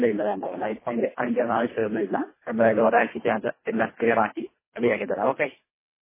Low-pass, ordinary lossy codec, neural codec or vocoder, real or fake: 3.6 kHz; MP3, 16 kbps; codec, 24 kHz, 1.5 kbps, HILCodec; fake